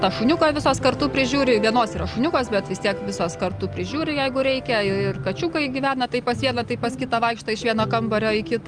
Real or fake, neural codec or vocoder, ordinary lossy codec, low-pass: real; none; Opus, 32 kbps; 9.9 kHz